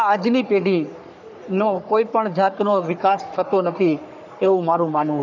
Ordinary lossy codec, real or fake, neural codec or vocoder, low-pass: none; fake; codec, 44.1 kHz, 3.4 kbps, Pupu-Codec; 7.2 kHz